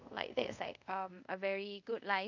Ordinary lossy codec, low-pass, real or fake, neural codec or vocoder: none; 7.2 kHz; fake; codec, 24 kHz, 0.5 kbps, DualCodec